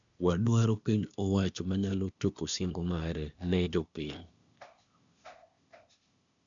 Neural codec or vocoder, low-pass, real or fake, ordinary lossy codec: codec, 16 kHz, 0.8 kbps, ZipCodec; 7.2 kHz; fake; none